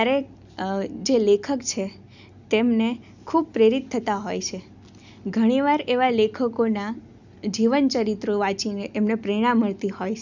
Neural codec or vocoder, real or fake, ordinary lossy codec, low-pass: none; real; none; 7.2 kHz